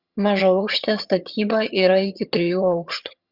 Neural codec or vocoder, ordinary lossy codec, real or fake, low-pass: vocoder, 22.05 kHz, 80 mel bands, HiFi-GAN; Opus, 64 kbps; fake; 5.4 kHz